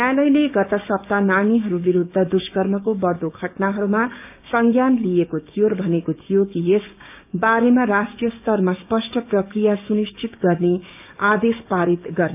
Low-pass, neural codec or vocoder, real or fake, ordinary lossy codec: 3.6 kHz; codec, 16 kHz, 6 kbps, DAC; fake; none